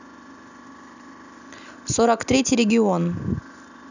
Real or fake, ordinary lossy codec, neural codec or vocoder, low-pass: real; none; none; 7.2 kHz